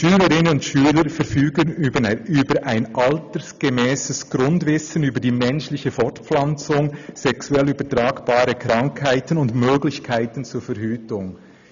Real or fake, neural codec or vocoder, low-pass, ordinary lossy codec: real; none; 7.2 kHz; none